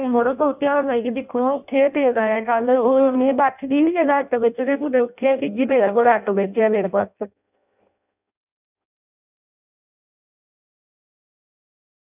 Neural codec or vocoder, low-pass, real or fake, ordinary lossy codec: codec, 16 kHz in and 24 kHz out, 0.6 kbps, FireRedTTS-2 codec; 3.6 kHz; fake; none